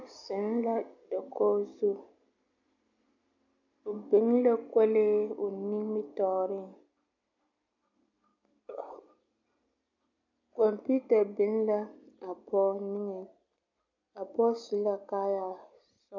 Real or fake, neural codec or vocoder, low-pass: real; none; 7.2 kHz